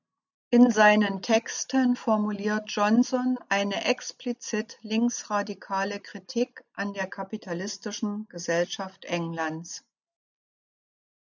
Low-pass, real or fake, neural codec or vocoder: 7.2 kHz; real; none